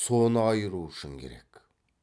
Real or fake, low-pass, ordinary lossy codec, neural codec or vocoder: real; none; none; none